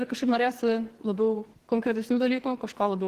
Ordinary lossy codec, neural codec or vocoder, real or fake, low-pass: Opus, 16 kbps; codec, 44.1 kHz, 2.6 kbps, SNAC; fake; 14.4 kHz